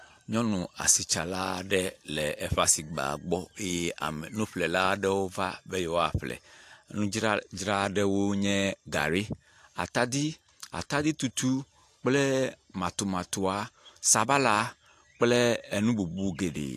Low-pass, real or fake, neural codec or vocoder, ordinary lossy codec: 14.4 kHz; real; none; AAC, 64 kbps